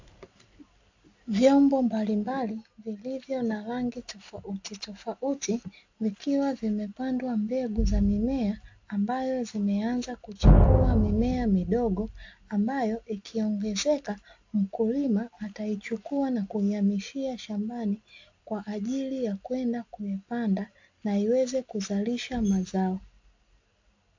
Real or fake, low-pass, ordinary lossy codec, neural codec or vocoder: real; 7.2 kHz; AAC, 48 kbps; none